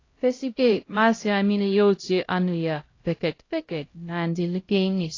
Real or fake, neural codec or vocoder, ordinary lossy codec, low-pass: fake; codec, 16 kHz, 0.5 kbps, X-Codec, HuBERT features, trained on LibriSpeech; AAC, 32 kbps; 7.2 kHz